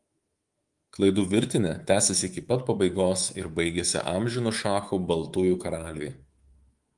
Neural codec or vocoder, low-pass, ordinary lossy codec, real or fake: codec, 24 kHz, 3.1 kbps, DualCodec; 10.8 kHz; Opus, 24 kbps; fake